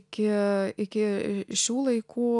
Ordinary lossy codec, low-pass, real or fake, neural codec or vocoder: AAC, 64 kbps; 10.8 kHz; real; none